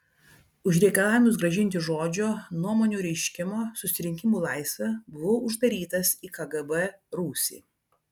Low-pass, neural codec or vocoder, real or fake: 19.8 kHz; none; real